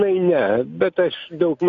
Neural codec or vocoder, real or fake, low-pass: none; real; 7.2 kHz